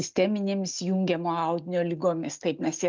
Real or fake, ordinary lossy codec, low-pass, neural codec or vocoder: real; Opus, 24 kbps; 7.2 kHz; none